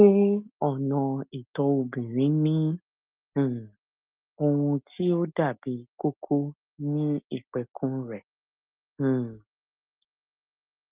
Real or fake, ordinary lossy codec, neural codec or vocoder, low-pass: real; Opus, 32 kbps; none; 3.6 kHz